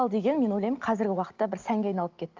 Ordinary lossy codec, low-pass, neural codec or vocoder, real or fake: Opus, 24 kbps; 7.2 kHz; none; real